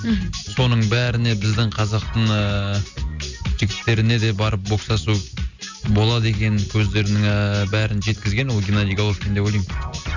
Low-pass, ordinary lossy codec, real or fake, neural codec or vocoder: 7.2 kHz; Opus, 64 kbps; real; none